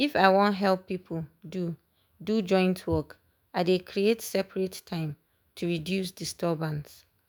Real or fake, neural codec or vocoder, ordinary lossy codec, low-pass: fake; autoencoder, 48 kHz, 128 numbers a frame, DAC-VAE, trained on Japanese speech; none; none